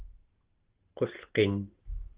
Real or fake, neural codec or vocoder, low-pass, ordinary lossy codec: real; none; 3.6 kHz; Opus, 16 kbps